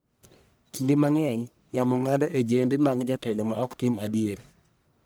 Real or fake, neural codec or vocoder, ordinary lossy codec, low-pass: fake; codec, 44.1 kHz, 1.7 kbps, Pupu-Codec; none; none